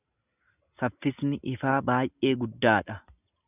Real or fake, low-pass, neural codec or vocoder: real; 3.6 kHz; none